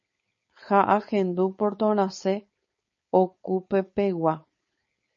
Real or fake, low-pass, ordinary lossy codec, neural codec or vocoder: fake; 7.2 kHz; MP3, 32 kbps; codec, 16 kHz, 4.8 kbps, FACodec